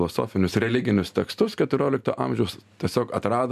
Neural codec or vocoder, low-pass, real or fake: none; 14.4 kHz; real